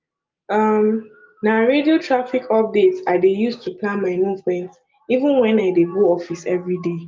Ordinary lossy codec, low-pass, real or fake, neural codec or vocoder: Opus, 24 kbps; 7.2 kHz; real; none